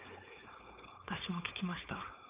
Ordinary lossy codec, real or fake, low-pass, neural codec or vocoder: Opus, 24 kbps; fake; 3.6 kHz; codec, 16 kHz, 4.8 kbps, FACodec